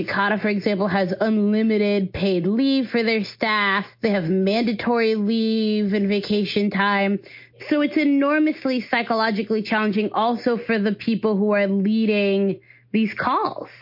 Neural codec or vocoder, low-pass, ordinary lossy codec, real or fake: none; 5.4 kHz; MP3, 32 kbps; real